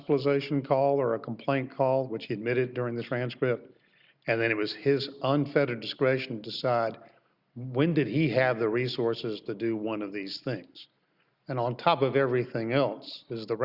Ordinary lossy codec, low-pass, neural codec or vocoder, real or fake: Opus, 64 kbps; 5.4 kHz; none; real